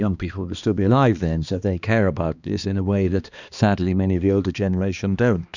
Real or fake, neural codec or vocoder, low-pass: fake; codec, 16 kHz, 2 kbps, X-Codec, HuBERT features, trained on balanced general audio; 7.2 kHz